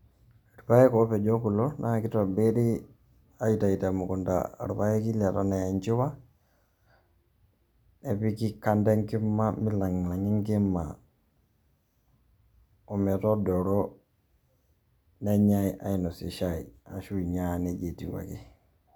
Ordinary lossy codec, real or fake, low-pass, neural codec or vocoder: none; real; none; none